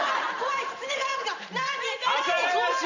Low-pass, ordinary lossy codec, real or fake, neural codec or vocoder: 7.2 kHz; none; fake; vocoder, 44.1 kHz, 128 mel bands every 512 samples, BigVGAN v2